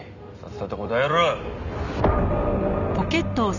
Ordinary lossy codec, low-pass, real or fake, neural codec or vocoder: none; 7.2 kHz; real; none